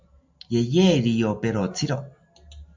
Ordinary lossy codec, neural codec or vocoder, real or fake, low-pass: MP3, 64 kbps; none; real; 7.2 kHz